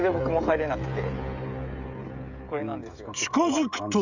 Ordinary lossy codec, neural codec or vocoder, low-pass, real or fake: none; codec, 16 kHz, 16 kbps, FreqCodec, smaller model; 7.2 kHz; fake